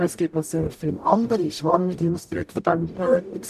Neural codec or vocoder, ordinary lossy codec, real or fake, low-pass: codec, 44.1 kHz, 0.9 kbps, DAC; none; fake; 14.4 kHz